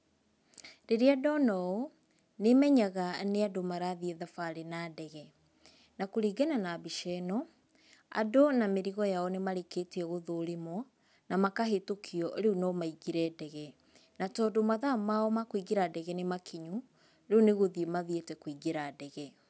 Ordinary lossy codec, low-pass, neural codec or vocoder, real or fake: none; none; none; real